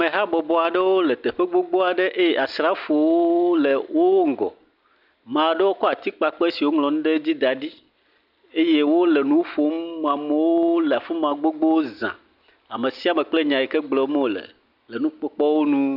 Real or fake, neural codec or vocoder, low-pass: real; none; 5.4 kHz